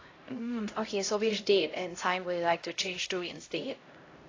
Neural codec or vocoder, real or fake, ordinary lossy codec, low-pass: codec, 16 kHz, 0.5 kbps, X-Codec, HuBERT features, trained on LibriSpeech; fake; AAC, 32 kbps; 7.2 kHz